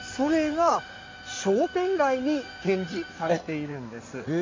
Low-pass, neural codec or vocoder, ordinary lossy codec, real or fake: 7.2 kHz; codec, 16 kHz in and 24 kHz out, 2.2 kbps, FireRedTTS-2 codec; MP3, 48 kbps; fake